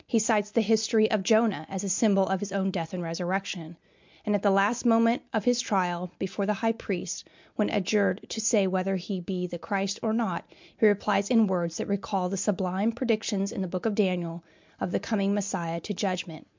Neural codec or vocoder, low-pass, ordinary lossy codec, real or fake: none; 7.2 kHz; MP3, 64 kbps; real